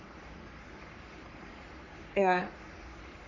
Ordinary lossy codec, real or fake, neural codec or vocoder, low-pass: none; fake; codec, 44.1 kHz, 3.4 kbps, Pupu-Codec; 7.2 kHz